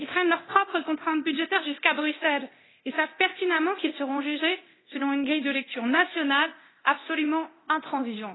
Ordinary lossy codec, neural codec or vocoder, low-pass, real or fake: AAC, 16 kbps; codec, 24 kHz, 0.9 kbps, DualCodec; 7.2 kHz; fake